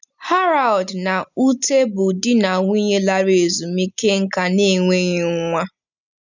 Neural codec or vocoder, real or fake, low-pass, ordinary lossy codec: none; real; 7.2 kHz; none